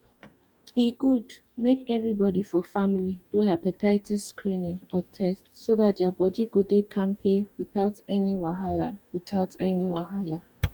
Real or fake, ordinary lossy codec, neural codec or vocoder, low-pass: fake; none; codec, 44.1 kHz, 2.6 kbps, DAC; 19.8 kHz